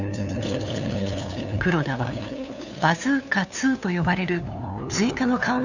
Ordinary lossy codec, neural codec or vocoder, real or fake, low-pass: none; codec, 16 kHz, 2 kbps, FunCodec, trained on LibriTTS, 25 frames a second; fake; 7.2 kHz